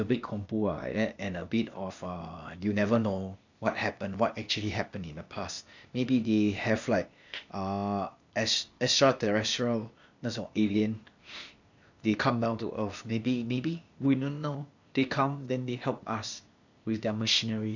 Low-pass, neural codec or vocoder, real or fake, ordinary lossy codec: 7.2 kHz; codec, 16 kHz, 0.8 kbps, ZipCodec; fake; none